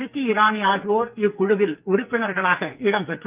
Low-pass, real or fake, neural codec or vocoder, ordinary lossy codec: 3.6 kHz; fake; codec, 44.1 kHz, 2.6 kbps, SNAC; Opus, 32 kbps